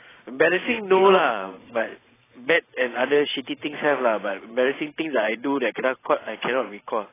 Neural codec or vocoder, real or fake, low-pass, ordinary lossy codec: vocoder, 44.1 kHz, 128 mel bands every 512 samples, BigVGAN v2; fake; 3.6 kHz; AAC, 16 kbps